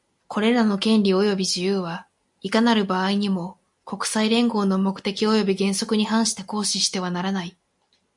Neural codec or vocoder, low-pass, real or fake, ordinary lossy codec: none; 10.8 kHz; real; MP3, 64 kbps